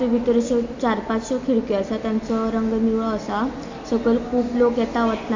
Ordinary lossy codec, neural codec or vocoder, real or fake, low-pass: MP3, 48 kbps; none; real; 7.2 kHz